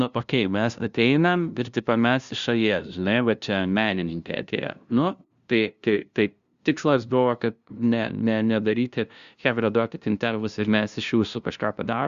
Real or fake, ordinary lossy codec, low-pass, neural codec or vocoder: fake; Opus, 64 kbps; 7.2 kHz; codec, 16 kHz, 0.5 kbps, FunCodec, trained on LibriTTS, 25 frames a second